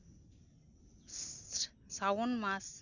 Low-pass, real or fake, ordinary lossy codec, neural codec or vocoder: 7.2 kHz; real; none; none